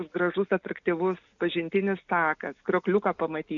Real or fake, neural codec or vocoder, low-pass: real; none; 7.2 kHz